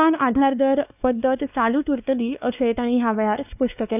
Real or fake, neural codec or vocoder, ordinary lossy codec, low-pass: fake; codec, 16 kHz, 2 kbps, X-Codec, WavLM features, trained on Multilingual LibriSpeech; none; 3.6 kHz